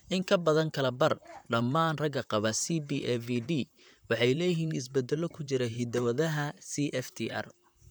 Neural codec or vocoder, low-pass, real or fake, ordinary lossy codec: vocoder, 44.1 kHz, 128 mel bands, Pupu-Vocoder; none; fake; none